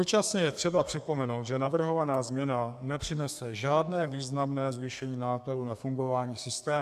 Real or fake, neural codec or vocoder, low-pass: fake; codec, 32 kHz, 1.9 kbps, SNAC; 14.4 kHz